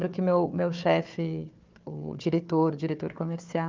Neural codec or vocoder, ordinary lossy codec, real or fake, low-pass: codec, 16 kHz, 4 kbps, FunCodec, trained on Chinese and English, 50 frames a second; Opus, 24 kbps; fake; 7.2 kHz